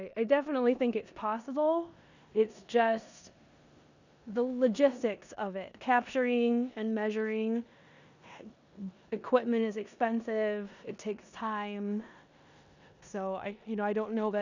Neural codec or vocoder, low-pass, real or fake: codec, 16 kHz in and 24 kHz out, 0.9 kbps, LongCat-Audio-Codec, four codebook decoder; 7.2 kHz; fake